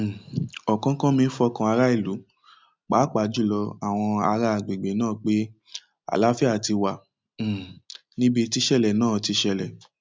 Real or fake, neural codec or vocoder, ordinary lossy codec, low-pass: real; none; none; none